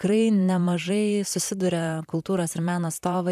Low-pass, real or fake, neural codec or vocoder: 14.4 kHz; fake; vocoder, 44.1 kHz, 128 mel bands, Pupu-Vocoder